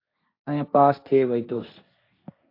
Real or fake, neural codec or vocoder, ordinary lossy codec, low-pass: fake; codec, 16 kHz, 1.1 kbps, Voila-Tokenizer; AAC, 32 kbps; 5.4 kHz